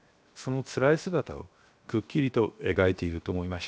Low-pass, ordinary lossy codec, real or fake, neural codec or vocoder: none; none; fake; codec, 16 kHz, 0.7 kbps, FocalCodec